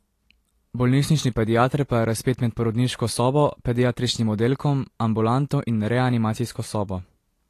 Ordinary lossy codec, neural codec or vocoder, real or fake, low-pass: AAC, 48 kbps; none; real; 14.4 kHz